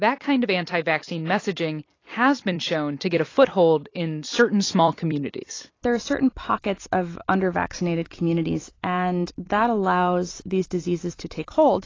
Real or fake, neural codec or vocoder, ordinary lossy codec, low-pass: fake; vocoder, 44.1 kHz, 128 mel bands every 256 samples, BigVGAN v2; AAC, 32 kbps; 7.2 kHz